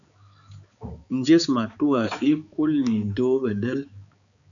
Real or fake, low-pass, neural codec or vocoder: fake; 7.2 kHz; codec, 16 kHz, 4 kbps, X-Codec, HuBERT features, trained on balanced general audio